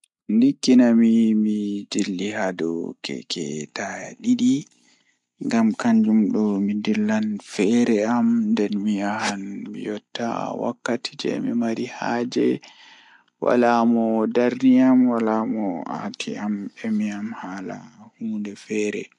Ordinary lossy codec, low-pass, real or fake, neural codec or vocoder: MP3, 64 kbps; 10.8 kHz; real; none